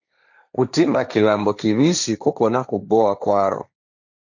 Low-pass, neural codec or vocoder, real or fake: 7.2 kHz; codec, 16 kHz, 1.1 kbps, Voila-Tokenizer; fake